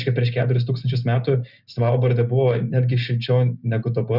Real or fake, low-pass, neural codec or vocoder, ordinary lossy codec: real; 5.4 kHz; none; Opus, 64 kbps